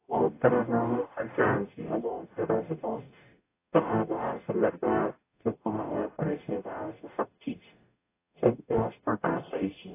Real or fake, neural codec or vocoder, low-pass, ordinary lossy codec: fake; codec, 44.1 kHz, 0.9 kbps, DAC; 3.6 kHz; AAC, 24 kbps